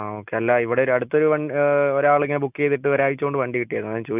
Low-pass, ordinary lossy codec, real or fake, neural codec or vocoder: 3.6 kHz; none; real; none